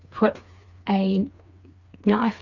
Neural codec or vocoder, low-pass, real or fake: codec, 16 kHz, 4 kbps, FreqCodec, smaller model; 7.2 kHz; fake